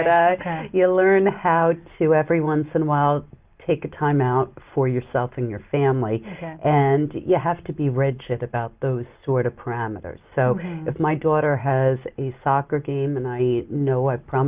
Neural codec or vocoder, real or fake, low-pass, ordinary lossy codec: none; real; 3.6 kHz; Opus, 24 kbps